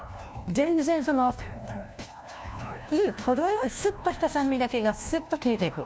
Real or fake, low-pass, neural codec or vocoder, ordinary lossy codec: fake; none; codec, 16 kHz, 1 kbps, FunCodec, trained on LibriTTS, 50 frames a second; none